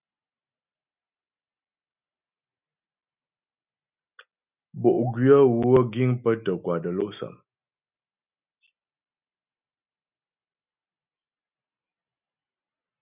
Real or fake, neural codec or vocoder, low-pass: real; none; 3.6 kHz